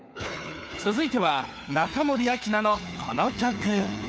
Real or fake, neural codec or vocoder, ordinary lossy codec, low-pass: fake; codec, 16 kHz, 4 kbps, FunCodec, trained on LibriTTS, 50 frames a second; none; none